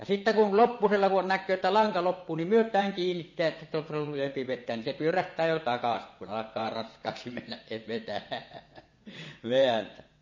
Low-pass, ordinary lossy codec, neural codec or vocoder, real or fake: 7.2 kHz; MP3, 32 kbps; vocoder, 22.05 kHz, 80 mel bands, WaveNeXt; fake